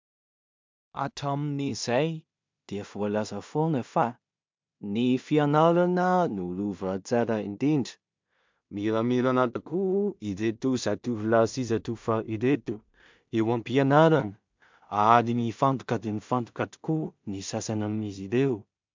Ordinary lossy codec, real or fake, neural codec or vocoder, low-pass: MP3, 64 kbps; fake; codec, 16 kHz in and 24 kHz out, 0.4 kbps, LongCat-Audio-Codec, two codebook decoder; 7.2 kHz